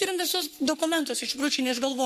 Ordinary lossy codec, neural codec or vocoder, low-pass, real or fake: MP3, 64 kbps; codec, 44.1 kHz, 3.4 kbps, Pupu-Codec; 14.4 kHz; fake